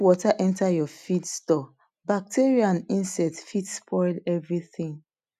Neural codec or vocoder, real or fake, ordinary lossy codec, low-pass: none; real; none; 14.4 kHz